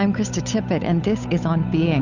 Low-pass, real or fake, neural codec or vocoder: 7.2 kHz; fake; codec, 16 kHz, 8 kbps, FunCodec, trained on Chinese and English, 25 frames a second